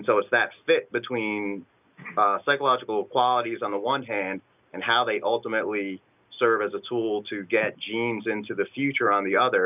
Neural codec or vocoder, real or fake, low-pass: none; real; 3.6 kHz